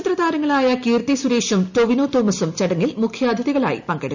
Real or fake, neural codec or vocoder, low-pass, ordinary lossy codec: real; none; 7.2 kHz; none